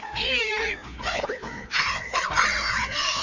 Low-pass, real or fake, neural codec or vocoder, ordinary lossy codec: 7.2 kHz; fake; codec, 16 kHz, 2 kbps, FreqCodec, larger model; none